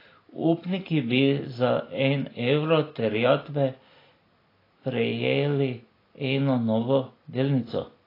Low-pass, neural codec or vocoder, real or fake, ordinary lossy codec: 5.4 kHz; none; real; AAC, 24 kbps